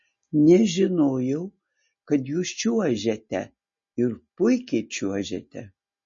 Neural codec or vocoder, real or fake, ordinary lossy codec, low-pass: none; real; MP3, 32 kbps; 7.2 kHz